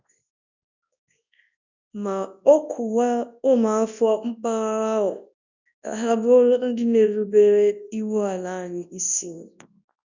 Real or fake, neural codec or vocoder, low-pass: fake; codec, 24 kHz, 0.9 kbps, WavTokenizer, large speech release; 7.2 kHz